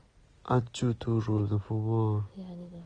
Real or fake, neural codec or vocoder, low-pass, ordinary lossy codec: real; none; 9.9 kHz; Opus, 24 kbps